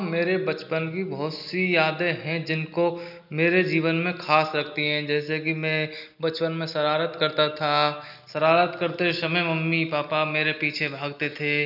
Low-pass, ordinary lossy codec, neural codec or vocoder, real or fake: 5.4 kHz; none; none; real